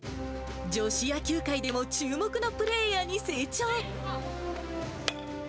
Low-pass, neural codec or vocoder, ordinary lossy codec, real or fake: none; none; none; real